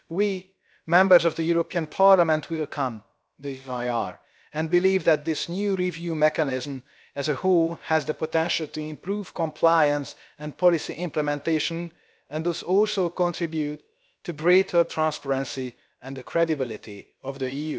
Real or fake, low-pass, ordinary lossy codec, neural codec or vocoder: fake; none; none; codec, 16 kHz, about 1 kbps, DyCAST, with the encoder's durations